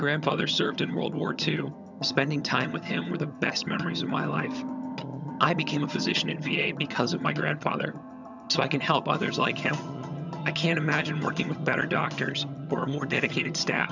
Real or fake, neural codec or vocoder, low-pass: fake; vocoder, 22.05 kHz, 80 mel bands, HiFi-GAN; 7.2 kHz